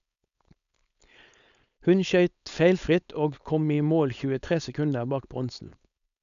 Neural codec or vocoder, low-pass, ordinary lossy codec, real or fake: codec, 16 kHz, 4.8 kbps, FACodec; 7.2 kHz; none; fake